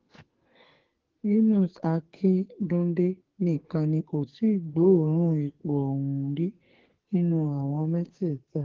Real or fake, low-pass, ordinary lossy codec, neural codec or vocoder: fake; 7.2 kHz; Opus, 16 kbps; codec, 44.1 kHz, 2.6 kbps, SNAC